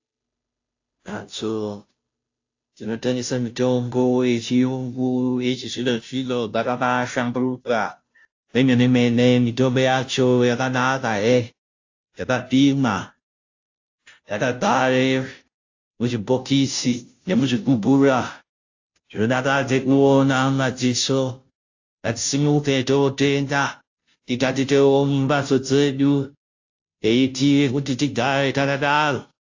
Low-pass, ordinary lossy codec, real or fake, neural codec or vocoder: 7.2 kHz; AAC, 48 kbps; fake; codec, 16 kHz, 0.5 kbps, FunCodec, trained on Chinese and English, 25 frames a second